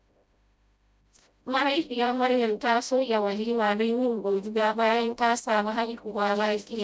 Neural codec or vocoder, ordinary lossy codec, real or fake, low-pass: codec, 16 kHz, 0.5 kbps, FreqCodec, smaller model; none; fake; none